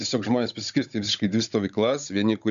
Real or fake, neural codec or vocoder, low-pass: fake; codec, 16 kHz, 16 kbps, FunCodec, trained on Chinese and English, 50 frames a second; 7.2 kHz